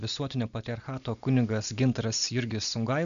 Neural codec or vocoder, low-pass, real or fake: none; 7.2 kHz; real